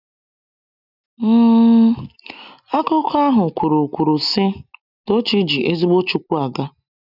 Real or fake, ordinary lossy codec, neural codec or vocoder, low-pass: real; none; none; 5.4 kHz